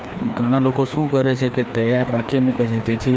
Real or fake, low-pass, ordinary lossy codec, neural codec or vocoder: fake; none; none; codec, 16 kHz, 2 kbps, FreqCodec, larger model